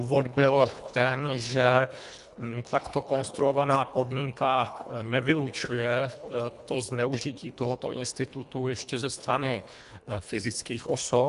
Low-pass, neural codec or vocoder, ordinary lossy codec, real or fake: 10.8 kHz; codec, 24 kHz, 1.5 kbps, HILCodec; MP3, 96 kbps; fake